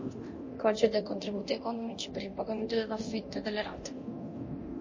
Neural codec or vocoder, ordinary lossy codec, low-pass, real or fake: codec, 24 kHz, 0.9 kbps, DualCodec; MP3, 32 kbps; 7.2 kHz; fake